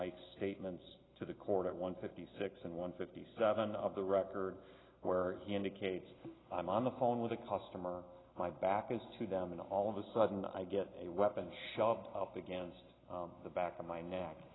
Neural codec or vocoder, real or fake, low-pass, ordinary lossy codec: none; real; 7.2 kHz; AAC, 16 kbps